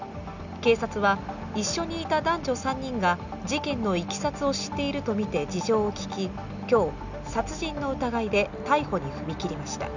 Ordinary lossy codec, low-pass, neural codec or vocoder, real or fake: none; 7.2 kHz; none; real